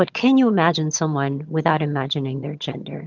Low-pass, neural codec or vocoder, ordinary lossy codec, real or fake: 7.2 kHz; vocoder, 22.05 kHz, 80 mel bands, HiFi-GAN; Opus, 24 kbps; fake